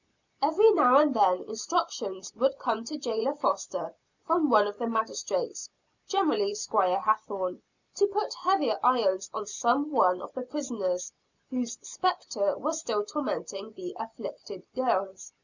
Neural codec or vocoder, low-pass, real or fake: none; 7.2 kHz; real